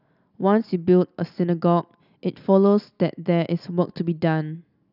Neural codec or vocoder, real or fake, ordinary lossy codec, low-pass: none; real; none; 5.4 kHz